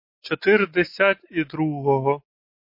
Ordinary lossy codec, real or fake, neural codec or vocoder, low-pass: MP3, 32 kbps; real; none; 5.4 kHz